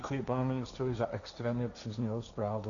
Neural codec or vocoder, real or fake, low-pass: codec, 16 kHz, 1.1 kbps, Voila-Tokenizer; fake; 7.2 kHz